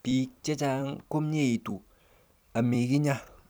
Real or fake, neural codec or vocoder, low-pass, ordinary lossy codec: fake; vocoder, 44.1 kHz, 128 mel bands every 256 samples, BigVGAN v2; none; none